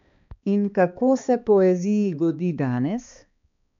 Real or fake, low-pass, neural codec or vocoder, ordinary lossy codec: fake; 7.2 kHz; codec, 16 kHz, 2 kbps, X-Codec, HuBERT features, trained on balanced general audio; MP3, 64 kbps